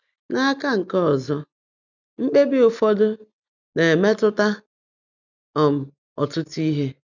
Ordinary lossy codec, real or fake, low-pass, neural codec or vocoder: none; real; 7.2 kHz; none